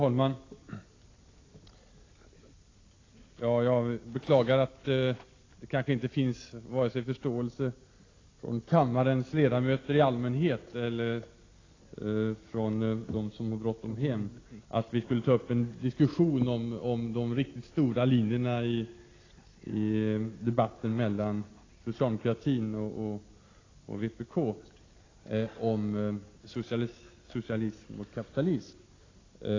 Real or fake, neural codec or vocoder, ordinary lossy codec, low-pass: real; none; AAC, 32 kbps; 7.2 kHz